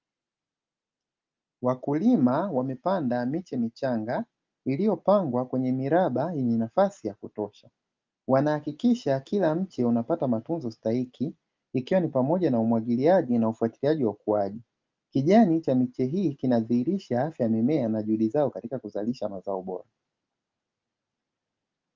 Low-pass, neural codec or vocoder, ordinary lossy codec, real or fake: 7.2 kHz; none; Opus, 24 kbps; real